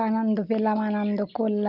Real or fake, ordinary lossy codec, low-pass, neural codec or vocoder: real; Opus, 24 kbps; 5.4 kHz; none